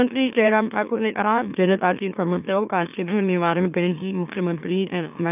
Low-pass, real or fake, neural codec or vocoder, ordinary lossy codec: 3.6 kHz; fake; autoencoder, 44.1 kHz, a latent of 192 numbers a frame, MeloTTS; none